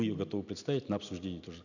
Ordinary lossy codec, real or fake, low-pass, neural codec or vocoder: none; real; 7.2 kHz; none